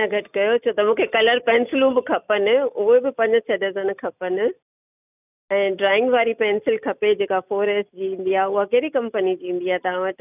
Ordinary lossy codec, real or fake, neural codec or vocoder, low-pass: none; real; none; 3.6 kHz